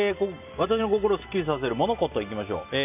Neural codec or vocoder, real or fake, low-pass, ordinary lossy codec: none; real; 3.6 kHz; none